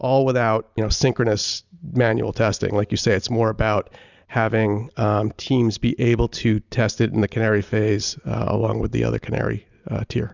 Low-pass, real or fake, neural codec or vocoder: 7.2 kHz; real; none